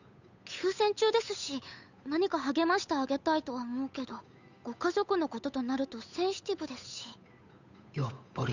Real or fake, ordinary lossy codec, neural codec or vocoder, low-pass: fake; none; codec, 16 kHz, 8 kbps, FunCodec, trained on Chinese and English, 25 frames a second; 7.2 kHz